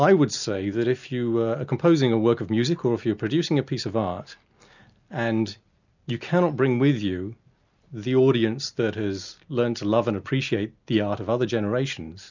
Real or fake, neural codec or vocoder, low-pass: real; none; 7.2 kHz